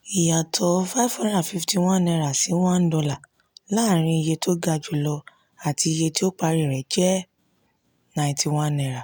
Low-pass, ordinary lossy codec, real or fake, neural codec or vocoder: none; none; real; none